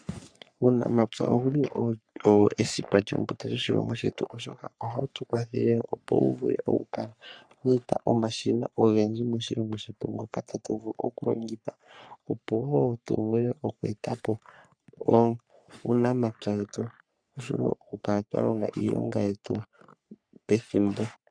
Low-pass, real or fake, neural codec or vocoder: 9.9 kHz; fake; codec, 44.1 kHz, 3.4 kbps, Pupu-Codec